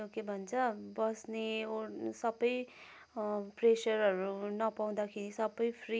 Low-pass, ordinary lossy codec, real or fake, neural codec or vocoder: none; none; real; none